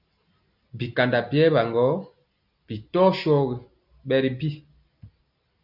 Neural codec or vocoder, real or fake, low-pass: none; real; 5.4 kHz